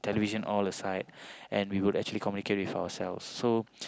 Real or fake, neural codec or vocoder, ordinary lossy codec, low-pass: real; none; none; none